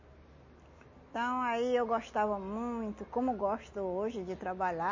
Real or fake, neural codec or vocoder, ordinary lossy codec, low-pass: real; none; MP3, 32 kbps; 7.2 kHz